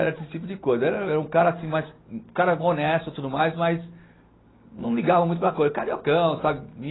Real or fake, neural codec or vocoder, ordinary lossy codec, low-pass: real; none; AAC, 16 kbps; 7.2 kHz